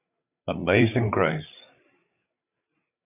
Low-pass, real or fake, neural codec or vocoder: 3.6 kHz; fake; codec, 16 kHz, 8 kbps, FreqCodec, larger model